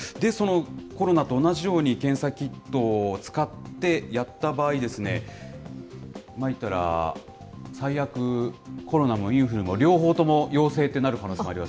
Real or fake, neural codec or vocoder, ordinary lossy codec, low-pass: real; none; none; none